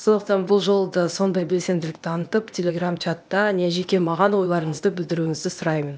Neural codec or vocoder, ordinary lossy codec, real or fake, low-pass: codec, 16 kHz, 0.8 kbps, ZipCodec; none; fake; none